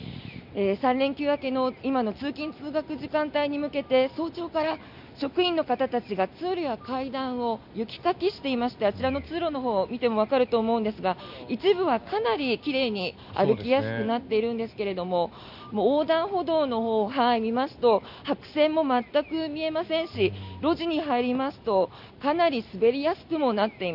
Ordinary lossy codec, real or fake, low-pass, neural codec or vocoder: none; real; 5.4 kHz; none